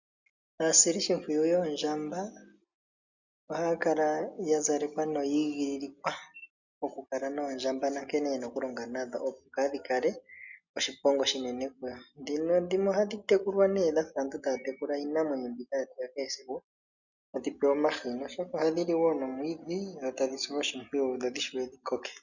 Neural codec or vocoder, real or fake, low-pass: none; real; 7.2 kHz